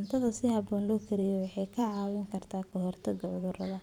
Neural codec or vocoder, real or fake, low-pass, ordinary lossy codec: vocoder, 44.1 kHz, 128 mel bands every 512 samples, BigVGAN v2; fake; 19.8 kHz; none